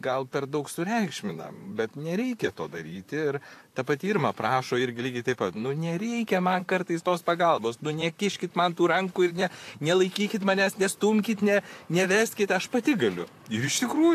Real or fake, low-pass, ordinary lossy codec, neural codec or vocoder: fake; 14.4 kHz; AAC, 64 kbps; vocoder, 44.1 kHz, 128 mel bands, Pupu-Vocoder